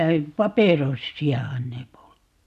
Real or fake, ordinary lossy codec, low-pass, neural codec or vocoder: real; none; 14.4 kHz; none